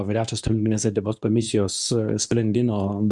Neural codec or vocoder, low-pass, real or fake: codec, 24 kHz, 0.9 kbps, WavTokenizer, medium speech release version 2; 10.8 kHz; fake